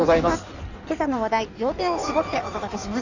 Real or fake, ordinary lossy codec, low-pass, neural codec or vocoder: fake; none; 7.2 kHz; codec, 16 kHz in and 24 kHz out, 1.1 kbps, FireRedTTS-2 codec